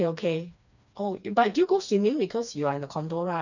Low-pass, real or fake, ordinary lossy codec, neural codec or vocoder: 7.2 kHz; fake; none; codec, 16 kHz, 2 kbps, FreqCodec, smaller model